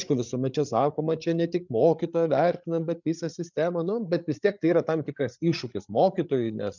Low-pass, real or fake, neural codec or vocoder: 7.2 kHz; fake; codec, 16 kHz, 8 kbps, FreqCodec, larger model